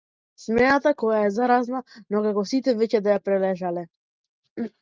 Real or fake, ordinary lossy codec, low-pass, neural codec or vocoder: real; Opus, 24 kbps; 7.2 kHz; none